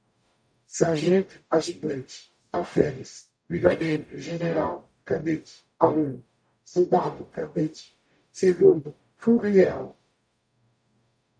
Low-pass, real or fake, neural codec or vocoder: 9.9 kHz; fake; codec, 44.1 kHz, 0.9 kbps, DAC